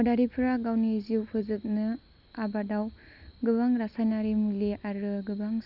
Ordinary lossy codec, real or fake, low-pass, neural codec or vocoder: none; real; 5.4 kHz; none